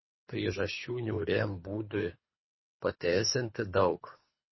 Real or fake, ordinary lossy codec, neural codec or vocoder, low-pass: fake; MP3, 24 kbps; codec, 24 kHz, 3 kbps, HILCodec; 7.2 kHz